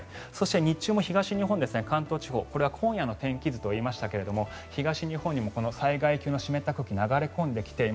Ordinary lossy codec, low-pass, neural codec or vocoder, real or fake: none; none; none; real